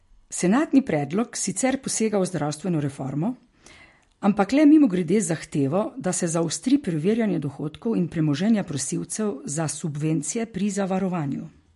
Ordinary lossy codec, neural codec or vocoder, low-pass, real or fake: MP3, 48 kbps; none; 14.4 kHz; real